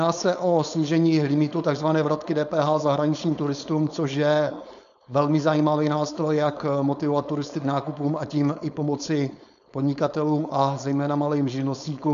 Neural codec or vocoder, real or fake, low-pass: codec, 16 kHz, 4.8 kbps, FACodec; fake; 7.2 kHz